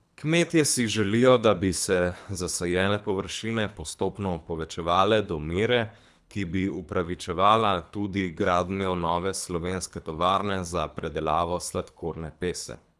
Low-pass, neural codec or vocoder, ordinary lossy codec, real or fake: none; codec, 24 kHz, 3 kbps, HILCodec; none; fake